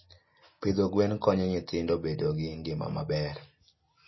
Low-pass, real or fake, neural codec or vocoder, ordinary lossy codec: 7.2 kHz; real; none; MP3, 24 kbps